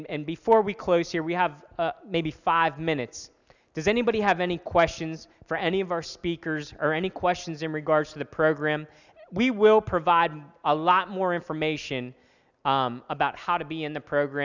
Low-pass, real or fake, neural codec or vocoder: 7.2 kHz; real; none